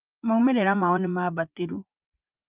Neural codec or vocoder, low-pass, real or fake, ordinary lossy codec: vocoder, 44.1 kHz, 80 mel bands, Vocos; 3.6 kHz; fake; Opus, 32 kbps